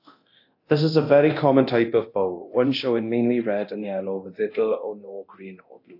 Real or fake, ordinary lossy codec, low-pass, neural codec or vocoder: fake; AAC, 32 kbps; 5.4 kHz; codec, 24 kHz, 0.9 kbps, DualCodec